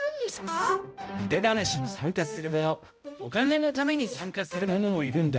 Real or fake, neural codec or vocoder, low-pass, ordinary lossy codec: fake; codec, 16 kHz, 0.5 kbps, X-Codec, HuBERT features, trained on balanced general audio; none; none